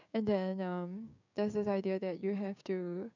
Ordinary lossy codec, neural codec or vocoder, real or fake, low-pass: none; codec, 16 kHz, 6 kbps, DAC; fake; 7.2 kHz